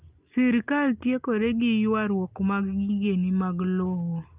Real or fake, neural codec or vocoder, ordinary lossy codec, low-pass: real; none; Opus, 24 kbps; 3.6 kHz